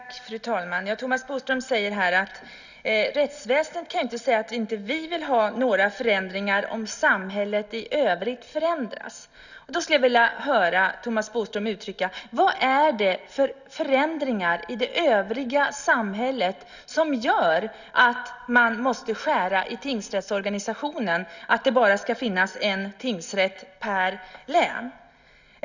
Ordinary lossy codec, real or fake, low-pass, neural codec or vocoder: none; real; 7.2 kHz; none